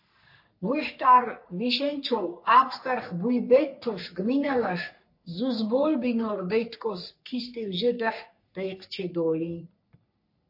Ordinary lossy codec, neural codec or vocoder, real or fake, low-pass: MP3, 32 kbps; codec, 44.1 kHz, 3.4 kbps, Pupu-Codec; fake; 5.4 kHz